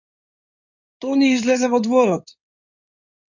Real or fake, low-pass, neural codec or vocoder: fake; 7.2 kHz; codec, 16 kHz in and 24 kHz out, 2.2 kbps, FireRedTTS-2 codec